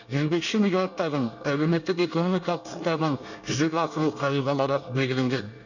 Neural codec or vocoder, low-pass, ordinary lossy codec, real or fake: codec, 24 kHz, 1 kbps, SNAC; 7.2 kHz; none; fake